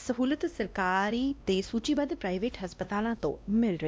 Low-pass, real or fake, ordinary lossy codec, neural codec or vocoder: none; fake; none; codec, 16 kHz, 1 kbps, X-Codec, WavLM features, trained on Multilingual LibriSpeech